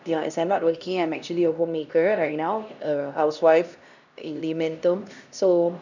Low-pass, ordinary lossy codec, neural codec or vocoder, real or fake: 7.2 kHz; none; codec, 16 kHz, 1 kbps, X-Codec, HuBERT features, trained on LibriSpeech; fake